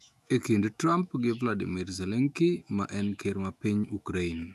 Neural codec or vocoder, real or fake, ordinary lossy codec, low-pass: autoencoder, 48 kHz, 128 numbers a frame, DAC-VAE, trained on Japanese speech; fake; none; 14.4 kHz